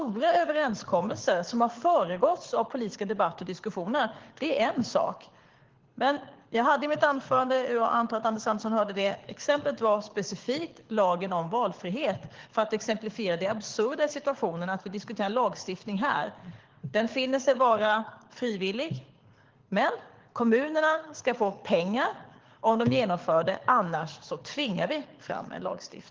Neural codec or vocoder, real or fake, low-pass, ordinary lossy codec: codec, 24 kHz, 6 kbps, HILCodec; fake; 7.2 kHz; Opus, 16 kbps